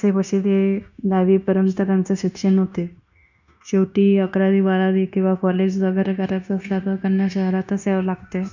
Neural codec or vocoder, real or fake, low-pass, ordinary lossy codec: codec, 16 kHz, 0.9 kbps, LongCat-Audio-Codec; fake; 7.2 kHz; none